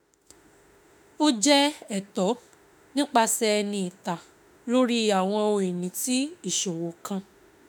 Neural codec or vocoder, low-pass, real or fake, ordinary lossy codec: autoencoder, 48 kHz, 32 numbers a frame, DAC-VAE, trained on Japanese speech; none; fake; none